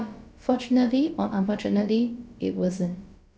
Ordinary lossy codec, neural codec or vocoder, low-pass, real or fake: none; codec, 16 kHz, about 1 kbps, DyCAST, with the encoder's durations; none; fake